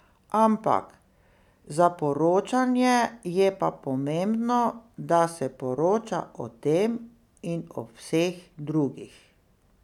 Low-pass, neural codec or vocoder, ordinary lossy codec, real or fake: 19.8 kHz; none; none; real